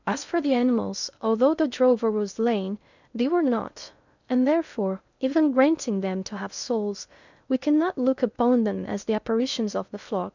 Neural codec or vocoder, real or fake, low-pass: codec, 16 kHz in and 24 kHz out, 0.6 kbps, FocalCodec, streaming, 2048 codes; fake; 7.2 kHz